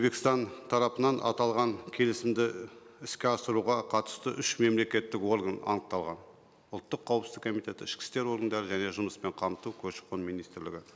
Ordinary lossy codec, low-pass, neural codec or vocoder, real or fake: none; none; none; real